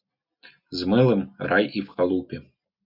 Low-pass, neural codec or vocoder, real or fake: 5.4 kHz; none; real